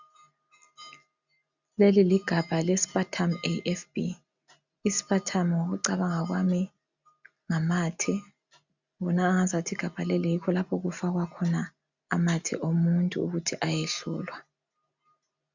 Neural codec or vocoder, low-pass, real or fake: none; 7.2 kHz; real